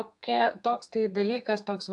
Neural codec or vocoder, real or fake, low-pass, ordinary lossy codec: codec, 32 kHz, 1.9 kbps, SNAC; fake; 10.8 kHz; AAC, 64 kbps